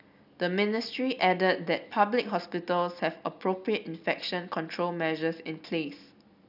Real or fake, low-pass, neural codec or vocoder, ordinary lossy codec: real; 5.4 kHz; none; none